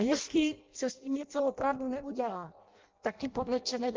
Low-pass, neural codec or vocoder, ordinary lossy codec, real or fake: 7.2 kHz; codec, 16 kHz in and 24 kHz out, 0.6 kbps, FireRedTTS-2 codec; Opus, 32 kbps; fake